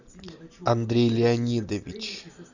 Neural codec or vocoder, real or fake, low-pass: none; real; 7.2 kHz